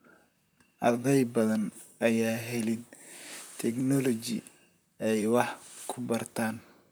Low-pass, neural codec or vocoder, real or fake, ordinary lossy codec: none; vocoder, 44.1 kHz, 128 mel bands, Pupu-Vocoder; fake; none